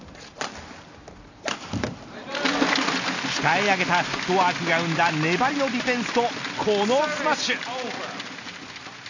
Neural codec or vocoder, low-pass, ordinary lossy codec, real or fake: none; 7.2 kHz; none; real